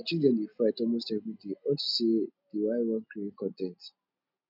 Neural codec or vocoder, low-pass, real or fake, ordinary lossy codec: none; 5.4 kHz; real; none